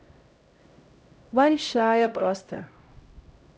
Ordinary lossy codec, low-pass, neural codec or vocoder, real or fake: none; none; codec, 16 kHz, 0.5 kbps, X-Codec, HuBERT features, trained on LibriSpeech; fake